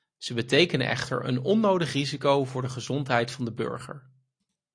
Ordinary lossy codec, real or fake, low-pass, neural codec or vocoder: AAC, 48 kbps; real; 9.9 kHz; none